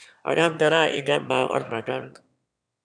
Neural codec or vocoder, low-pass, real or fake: autoencoder, 22.05 kHz, a latent of 192 numbers a frame, VITS, trained on one speaker; 9.9 kHz; fake